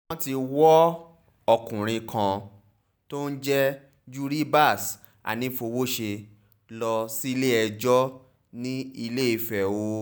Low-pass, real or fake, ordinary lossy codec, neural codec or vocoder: none; real; none; none